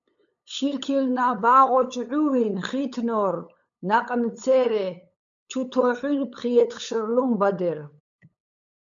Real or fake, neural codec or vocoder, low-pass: fake; codec, 16 kHz, 8 kbps, FunCodec, trained on LibriTTS, 25 frames a second; 7.2 kHz